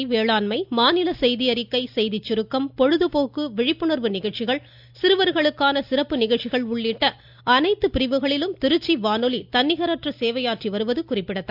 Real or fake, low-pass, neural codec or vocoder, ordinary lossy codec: real; 5.4 kHz; none; none